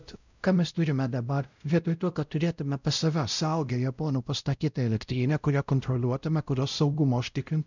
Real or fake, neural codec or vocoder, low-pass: fake; codec, 16 kHz, 0.5 kbps, X-Codec, WavLM features, trained on Multilingual LibriSpeech; 7.2 kHz